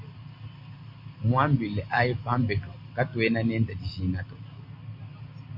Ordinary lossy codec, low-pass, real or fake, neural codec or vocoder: MP3, 32 kbps; 5.4 kHz; real; none